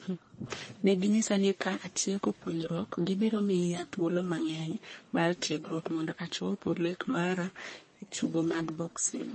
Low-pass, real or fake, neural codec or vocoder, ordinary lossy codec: 9.9 kHz; fake; codec, 44.1 kHz, 1.7 kbps, Pupu-Codec; MP3, 32 kbps